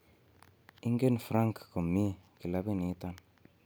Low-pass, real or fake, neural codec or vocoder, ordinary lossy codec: none; real; none; none